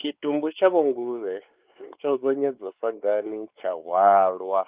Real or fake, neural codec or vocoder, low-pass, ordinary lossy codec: fake; codec, 16 kHz, 4 kbps, X-Codec, WavLM features, trained on Multilingual LibriSpeech; 3.6 kHz; Opus, 32 kbps